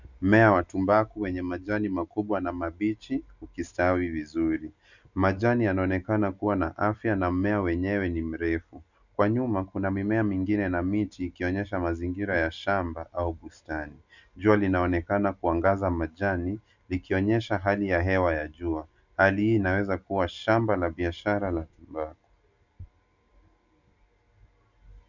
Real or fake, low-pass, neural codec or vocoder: real; 7.2 kHz; none